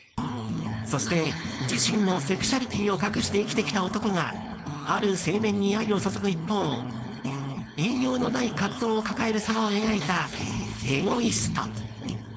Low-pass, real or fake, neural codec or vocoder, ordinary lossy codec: none; fake; codec, 16 kHz, 4.8 kbps, FACodec; none